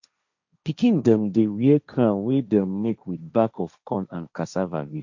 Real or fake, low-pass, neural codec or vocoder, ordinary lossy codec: fake; 7.2 kHz; codec, 16 kHz, 1.1 kbps, Voila-Tokenizer; none